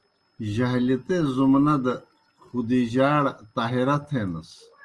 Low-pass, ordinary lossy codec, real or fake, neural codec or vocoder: 10.8 kHz; Opus, 24 kbps; real; none